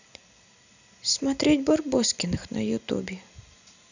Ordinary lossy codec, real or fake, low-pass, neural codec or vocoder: none; real; 7.2 kHz; none